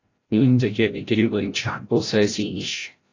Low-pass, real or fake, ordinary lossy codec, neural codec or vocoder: 7.2 kHz; fake; AAC, 32 kbps; codec, 16 kHz, 0.5 kbps, FreqCodec, larger model